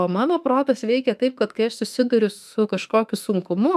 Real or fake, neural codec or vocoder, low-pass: fake; autoencoder, 48 kHz, 32 numbers a frame, DAC-VAE, trained on Japanese speech; 14.4 kHz